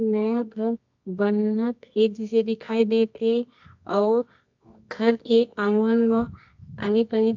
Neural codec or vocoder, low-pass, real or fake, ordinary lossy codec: codec, 24 kHz, 0.9 kbps, WavTokenizer, medium music audio release; 7.2 kHz; fake; MP3, 64 kbps